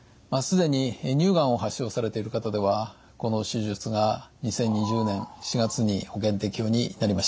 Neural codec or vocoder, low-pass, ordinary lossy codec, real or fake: none; none; none; real